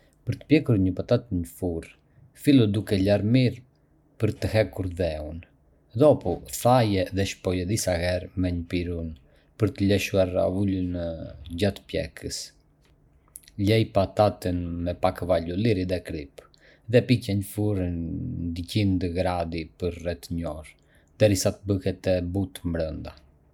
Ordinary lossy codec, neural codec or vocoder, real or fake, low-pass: none; none; real; 19.8 kHz